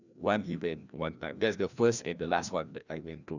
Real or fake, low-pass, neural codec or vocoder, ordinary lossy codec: fake; 7.2 kHz; codec, 16 kHz, 1 kbps, FreqCodec, larger model; none